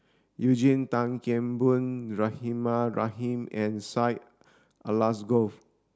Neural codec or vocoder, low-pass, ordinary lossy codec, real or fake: none; none; none; real